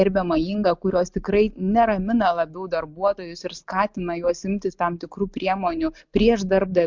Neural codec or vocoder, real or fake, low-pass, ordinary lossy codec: none; real; 7.2 kHz; MP3, 64 kbps